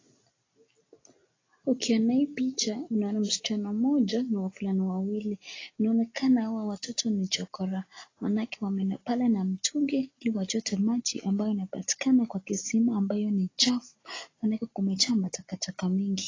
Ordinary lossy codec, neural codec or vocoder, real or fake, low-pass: AAC, 32 kbps; none; real; 7.2 kHz